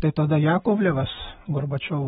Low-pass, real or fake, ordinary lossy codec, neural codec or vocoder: 9.9 kHz; real; AAC, 16 kbps; none